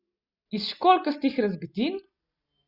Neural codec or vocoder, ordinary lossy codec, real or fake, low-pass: none; Opus, 64 kbps; real; 5.4 kHz